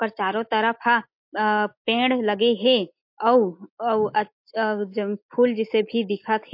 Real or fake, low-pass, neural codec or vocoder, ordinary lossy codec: real; 5.4 kHz; none; MP3, 32 kbps